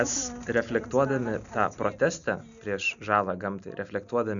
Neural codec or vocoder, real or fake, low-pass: none; real; 7.2 kHz